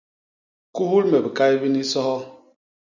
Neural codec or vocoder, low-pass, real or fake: none; 7.2 kHz; real